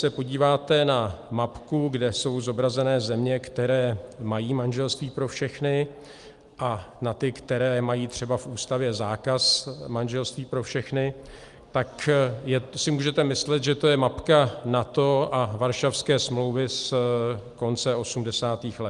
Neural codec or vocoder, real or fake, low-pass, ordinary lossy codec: none; real; 10.8 kHz; Opus, 32 kbps